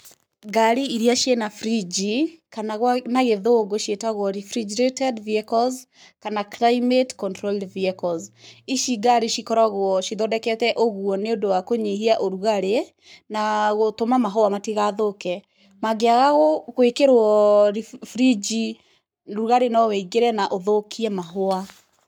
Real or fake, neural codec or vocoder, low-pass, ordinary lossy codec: fake; codec, 44.1 kHz, 7.8 kbps, Pupu-Codec; none; none